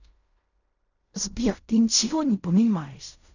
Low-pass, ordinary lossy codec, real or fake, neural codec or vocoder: 7.2 kHz; none; fake; codec, 16 kHz in and 24 kHz out, 0.4 kbps, LongCat-Audio-Codec, fine tuned four codebook decoder